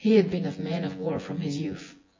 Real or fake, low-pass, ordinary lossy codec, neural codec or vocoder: fake; 7.2 kHz; MP3, 32 kbps; vocoder, 24 kHz, 100 mel bands, Vocos